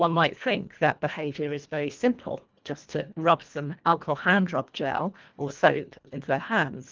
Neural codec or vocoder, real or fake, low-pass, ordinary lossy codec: codec, 24 kHz, 1.5 kbps, HILCodec; fake; 7.2 kHz; Opus, 32 kbps